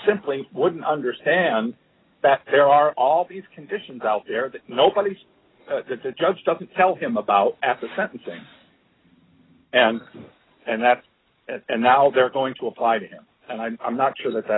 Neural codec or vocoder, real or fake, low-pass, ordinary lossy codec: none; real; 7.2 kHz; AAC, 16 kbps